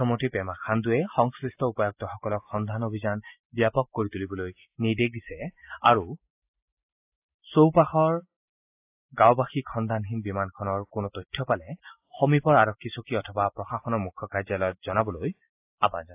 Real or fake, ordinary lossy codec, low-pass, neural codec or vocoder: real; AAC, 32 kbps; 3.6 kHz; none